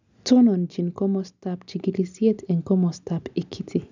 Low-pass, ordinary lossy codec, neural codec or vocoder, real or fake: 7.2 kHz; none; none; real